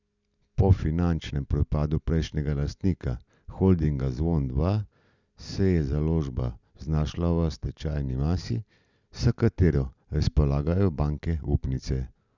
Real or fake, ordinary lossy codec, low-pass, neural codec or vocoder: real; none; 7.2 kHz; none